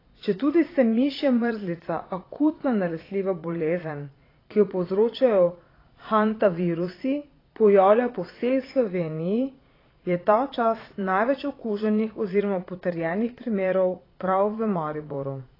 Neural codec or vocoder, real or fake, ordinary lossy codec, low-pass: vocoder, 22.05 kHz, 80 mel bands, Vocos; fake; AAC, 24 kbps; 5.4 kHz